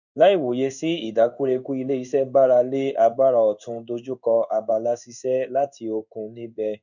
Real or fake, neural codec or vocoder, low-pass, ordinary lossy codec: fake; codec, 16 kHz in and 24 kHz out, 1 kbps, XY-Tokenizer; 7.2 kHz; none